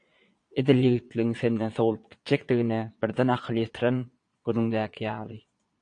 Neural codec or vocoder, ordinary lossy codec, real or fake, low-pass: vocoder, 22.05 kHz, 80 mel bands, Vocos; AAC, 48 kbps; fake; 9.9 kHz